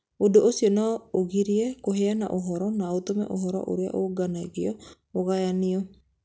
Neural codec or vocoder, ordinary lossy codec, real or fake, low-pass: none; none; real; none